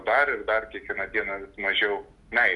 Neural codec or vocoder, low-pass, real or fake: none; 10.8 kHz; real